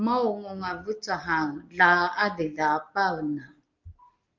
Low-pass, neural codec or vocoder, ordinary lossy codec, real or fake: 7.2 kHz; none; Opus, 32 kbps; real